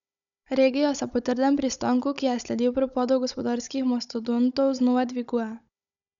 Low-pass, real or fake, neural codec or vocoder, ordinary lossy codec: 7.2 kHz; fake; codec, 16 kHz, 16 kbps, FunCodec, trained on Chinese and English, 50 frames a second; none